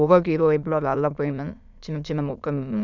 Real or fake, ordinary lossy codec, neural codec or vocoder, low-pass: fake; none; autoencoder, 22.05 kHz, a latent of 192 numbers a frame, VITS, trained on many speakers; 7.2 kHz